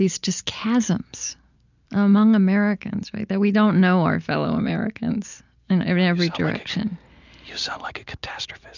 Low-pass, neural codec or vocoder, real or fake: 7.2 kHz; none; real